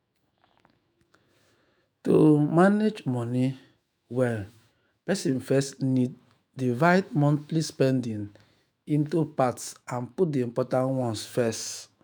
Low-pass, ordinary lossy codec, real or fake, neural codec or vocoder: none; none; fake; autoencoder, 48 kHz, 128 numbers a frame, DAC-VAE, trained on Japanese speech